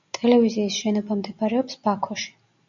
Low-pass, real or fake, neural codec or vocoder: 7.2 kHz; real; none